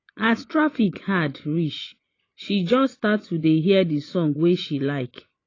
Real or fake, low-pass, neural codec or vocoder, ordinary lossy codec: fake; 7.2 kHz; vocoder, 24 kHz, 100 mel bands, Vocos; AAC, 32 kbps